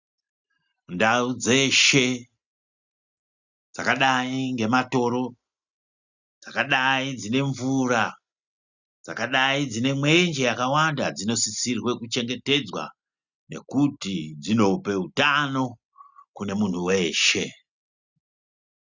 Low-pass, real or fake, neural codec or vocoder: 7.2 kHz; real; none